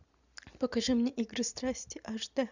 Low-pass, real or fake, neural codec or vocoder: 7.2 kHz; real; none